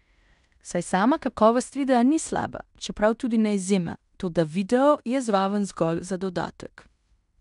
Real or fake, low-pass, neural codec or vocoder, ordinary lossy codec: fake; 10.8 kHz; codec, 16 kHz in and 24 kHz out, 0.9 kbps, LongCat-Audio-Codec, fine tuned four codebook decoder; none